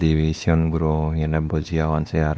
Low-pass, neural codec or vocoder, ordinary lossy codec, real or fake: none; codec, 16 kHz, 2 kbps, X-Codec, WavLM features, trained on Multilingual LibriSpeech; none; fake